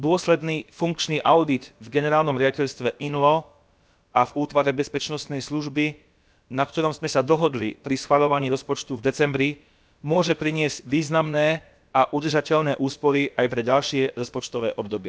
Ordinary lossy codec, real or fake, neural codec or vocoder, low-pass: none; fake; codec, 16 kHz, about 1 kbps, DyCAST, with the encoder's durations; none